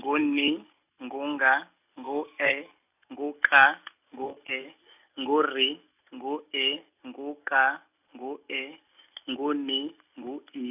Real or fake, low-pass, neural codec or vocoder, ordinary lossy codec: real; 3.6 kHz; none; none